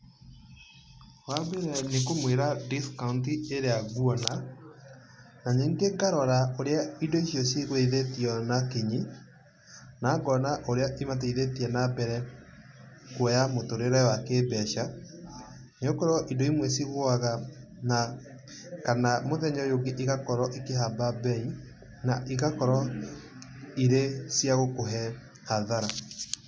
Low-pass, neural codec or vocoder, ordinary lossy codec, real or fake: none; none; none; real